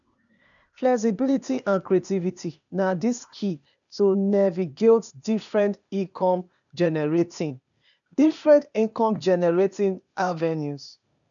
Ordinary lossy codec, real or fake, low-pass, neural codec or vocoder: none; fake; 7.2 kHz; codec, 16 kHz, 0.8 kbps, ZipCodec